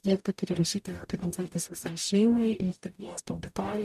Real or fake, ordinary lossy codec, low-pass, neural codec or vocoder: fake; MP3, 96 kbps; 14.4 kHz; codec, 44.1 kHz, 0.9 kbps, DAC